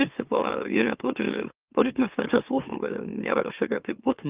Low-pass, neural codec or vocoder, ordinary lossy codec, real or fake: 3.6 kHz; autoencoder, 44.1 kHz, a latent of 192 numbers a frame, MeloTTS; Opus, 32 kbps; fake